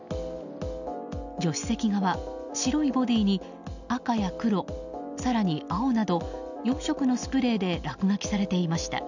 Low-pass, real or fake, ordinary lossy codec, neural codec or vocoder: 7.2 kHz; real; none; none